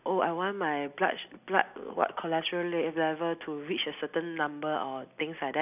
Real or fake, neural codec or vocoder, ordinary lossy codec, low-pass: real; none; none; 3.6 kHz